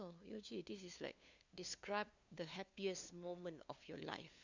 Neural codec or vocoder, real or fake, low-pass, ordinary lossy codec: codec, 16 kHz, 2 kbps, FunCodec, trained on LibriTTS, 25 frames a second; fake; 7.2 kHz; Opus, 64 kbps